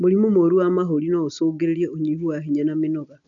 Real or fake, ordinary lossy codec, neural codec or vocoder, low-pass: real; none; none; 7.2 kHz